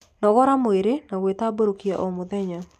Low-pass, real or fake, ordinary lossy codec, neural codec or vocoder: 14.4 kHz; real; none; none